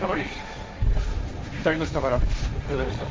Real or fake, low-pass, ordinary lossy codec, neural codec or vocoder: fake; none; none; codec, 16 kHz, 1.1 kbps, Voila-Tokenizer